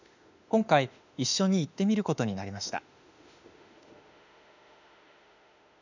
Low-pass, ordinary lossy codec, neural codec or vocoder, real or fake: 7.2 kHz; none; autoencoder, 48 kHz, 32 numbers a frame, DAC-VAE, trained on Japanese speech; fake